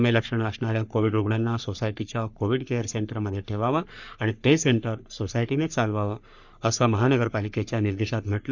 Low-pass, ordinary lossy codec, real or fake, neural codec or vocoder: 7.2 kHz; none; fake; codec, 44.1 kHz, 3.4 kbps, Pupu-Codec